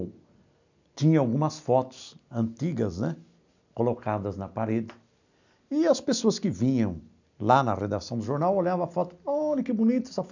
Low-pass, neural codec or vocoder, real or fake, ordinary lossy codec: 7.2 kHz; none; real; none